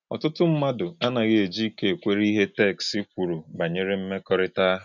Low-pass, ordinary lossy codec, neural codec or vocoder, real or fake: 7.2 kHz; none; none; real